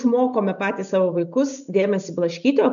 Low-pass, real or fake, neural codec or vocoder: 7.2 kHz; real; none